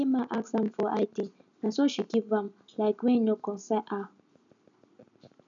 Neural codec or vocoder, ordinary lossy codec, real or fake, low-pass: none; none; real; 7.2 kHz